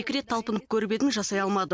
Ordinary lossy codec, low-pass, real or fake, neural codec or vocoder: none; none; real; none